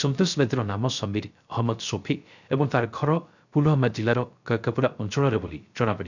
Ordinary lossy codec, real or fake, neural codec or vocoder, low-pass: none; fake; codec, 16 kHz, 0.3 kbps, FocalCodec; 7.2 kHz